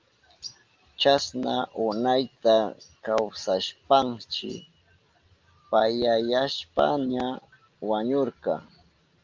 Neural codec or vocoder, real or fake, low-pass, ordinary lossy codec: none; real; 7.2 kHz; Opus, 32 kbps